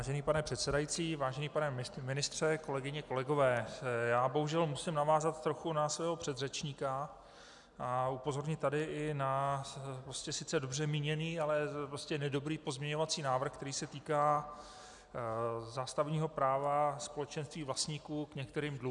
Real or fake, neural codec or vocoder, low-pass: real; none; 10.8 kHz